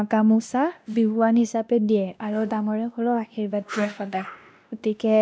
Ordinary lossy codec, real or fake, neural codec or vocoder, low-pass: none; fake; codec, 16 kHz, 1 kbps, X-Codec, WavLM features, trained on Multilingual LibriSpeech; none